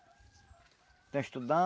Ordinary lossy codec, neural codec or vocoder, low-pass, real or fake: none; none; none; real